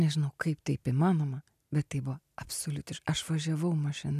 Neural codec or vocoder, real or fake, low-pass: none; real; 14.4 kHz